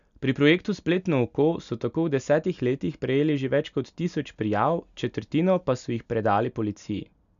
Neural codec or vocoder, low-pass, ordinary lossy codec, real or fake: none; 7.2 kHz; none; real